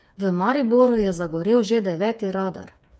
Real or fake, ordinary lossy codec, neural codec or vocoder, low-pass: fake; none; codec, 16 kHz, 4 kbps, FreqCodec, smaller model; none